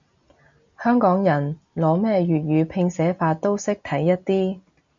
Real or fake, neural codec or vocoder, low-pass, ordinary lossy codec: real; none; 7.2 kHz; AAC, 64 kbps